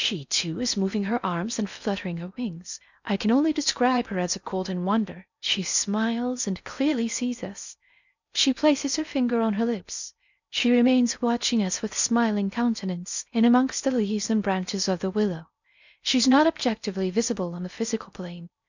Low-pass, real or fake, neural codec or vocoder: 7.2 kHz; fake; codec, 16 kHz in and 24 kHz out, 0.6 kbps, FocalCodec, streaming, 4096 codes